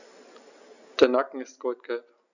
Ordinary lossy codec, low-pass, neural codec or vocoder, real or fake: none; 7.2 kHz; none; real